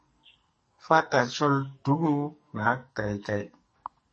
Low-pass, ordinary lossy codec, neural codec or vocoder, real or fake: 10.8 kHz; MP3, 32 kbps; codec, 44.1 kHz, 2.6 kbps, SNAC; fake